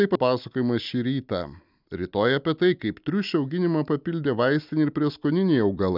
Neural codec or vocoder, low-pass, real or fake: none; 5.4 kHz; real